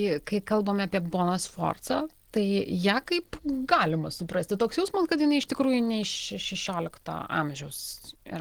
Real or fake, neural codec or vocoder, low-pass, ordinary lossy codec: real; none; 19.8 kHz; Opus, 16 kbps